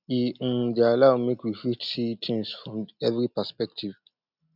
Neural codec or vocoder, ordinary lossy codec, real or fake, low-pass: none; none; real; 5.4 kHz